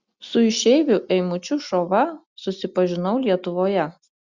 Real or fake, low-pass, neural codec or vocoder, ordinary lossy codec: real; 7.2 kHz; none; Opus, 64 kbps